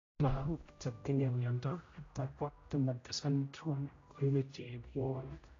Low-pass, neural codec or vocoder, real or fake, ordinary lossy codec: 7.2 kHz; codec, 16 kHz, 0.5 kbps, X-Codec, HuBERT features, trained on general audio; fake; AAC, 32 kbps